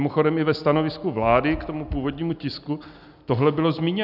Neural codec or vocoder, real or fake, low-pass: none; real; 5.4 kHz